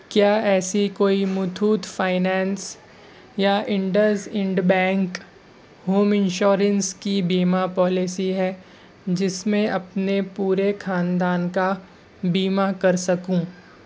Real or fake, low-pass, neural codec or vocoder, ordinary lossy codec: real; none; none; none